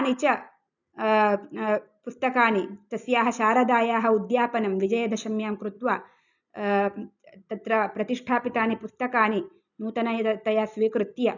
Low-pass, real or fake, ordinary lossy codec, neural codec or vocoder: 7.2 kHz; real; none; none